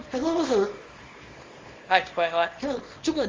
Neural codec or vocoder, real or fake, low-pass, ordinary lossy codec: codec, 24 kHz, 0.9 kbps, WavTokenizer, small release; fake; 7.2 kHz; Opus, 32 kbps